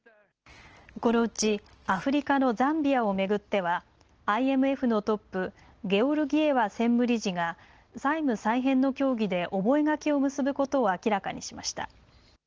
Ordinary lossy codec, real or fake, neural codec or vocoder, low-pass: Opus, 16 kbps; real; none; 7.2 kHz